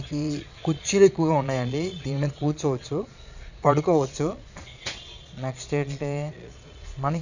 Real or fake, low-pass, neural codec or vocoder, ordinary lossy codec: fake; 7.2 kHz; vocoder, 22.05 kHz, 80 mel bands, Vocos; none